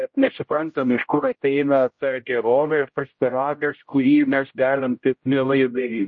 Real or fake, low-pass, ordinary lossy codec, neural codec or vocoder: fake; 7.2 kHz; MP3, 32 kbps; codec, 16 kHz, 0.5 kbps, X-Codec, HuBERT features, trained on general audio